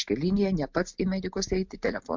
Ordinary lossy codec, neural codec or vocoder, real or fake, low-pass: MP3, 64 kbps; none; real; 7.2 kHz